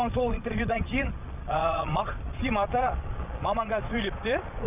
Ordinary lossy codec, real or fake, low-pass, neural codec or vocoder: none; fake; 3.6 kHz; vocoder, 22.05 kHz, 80 mel bands, Vocos